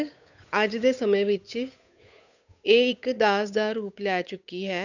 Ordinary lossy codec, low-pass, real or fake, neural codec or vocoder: none; 7.2 kHz; fake; codec, 16 kHz, 8 kbps, FunCodec, trained on Chinese and English, 25 frames a second